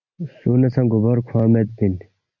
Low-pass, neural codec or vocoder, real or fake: 7.2 kHz; none; real